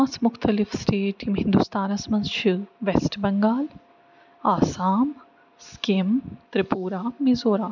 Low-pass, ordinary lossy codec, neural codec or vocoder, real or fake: 7.2 kHz; none; none; real